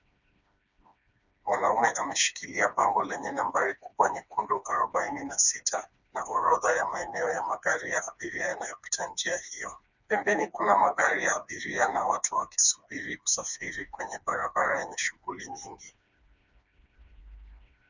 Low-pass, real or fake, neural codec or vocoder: 7.2 kHz; fake; codec, 16 kHz, 2 kbps, FreqCodec, smaller model